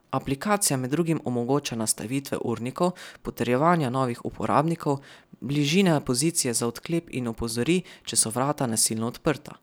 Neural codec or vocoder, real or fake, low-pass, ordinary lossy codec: none; real; none; none